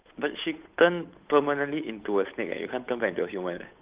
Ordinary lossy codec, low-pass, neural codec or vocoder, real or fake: Opus, 32 kbps; 3.6 kHz; codec, 16 kHz, 8 kbps, FunCodec, trained on Chinese and English, 25 frames a second; fake